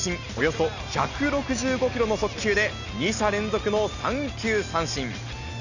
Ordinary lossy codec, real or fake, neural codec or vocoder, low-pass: none; real; none; 7.2 kHz